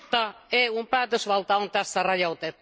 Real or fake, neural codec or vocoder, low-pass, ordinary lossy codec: real; none; none; none